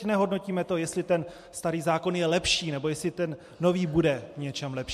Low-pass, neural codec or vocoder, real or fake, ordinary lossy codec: 14.4 kHz; none; real; MP3, 64 kbps